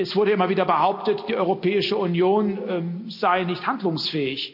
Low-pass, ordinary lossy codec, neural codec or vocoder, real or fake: 5.4 kHz; none; none; real